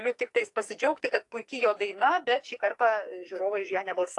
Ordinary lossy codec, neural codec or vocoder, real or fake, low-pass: AAC, 48 kbps; codec, 44.1 kHz, 2.6 kbps, SNAC; fake; 10.8 kHz